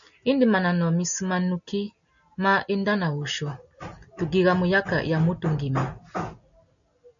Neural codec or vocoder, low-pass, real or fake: none; 7.2 kHz; real